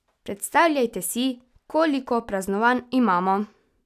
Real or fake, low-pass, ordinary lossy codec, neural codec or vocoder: real; 14.4 kHz; none; none